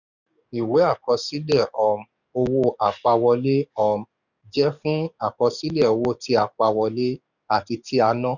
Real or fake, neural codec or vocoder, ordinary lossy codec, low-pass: fake; codec, 44.1 kHz, 7.8 kbps, DAC; none; 7.2 kHz